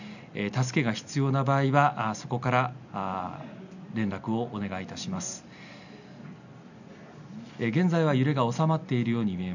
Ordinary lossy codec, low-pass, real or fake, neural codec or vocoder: none; 7.2 kHz; real; none